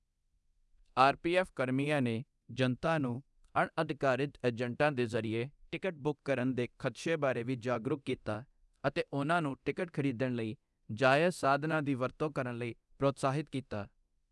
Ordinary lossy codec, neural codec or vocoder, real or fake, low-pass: none; codec, 24 kHz, 0.9 kbps, DualCodec; fake; none